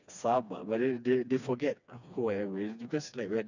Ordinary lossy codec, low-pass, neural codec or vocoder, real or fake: none; 7.2 kHz; codec, 16 kHz, 2 kbps, FreqCodec, smaller model; fake